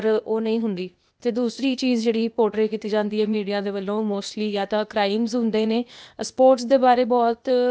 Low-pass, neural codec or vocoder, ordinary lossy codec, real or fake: none; codec, 16 kHz, 0.8 kbps, ZipCodec; none; fake